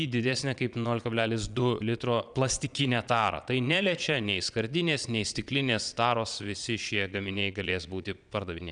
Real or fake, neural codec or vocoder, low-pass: fake; vocoder, 22.05 kHz, 80 mel bands, Vocos; 9.9 kHz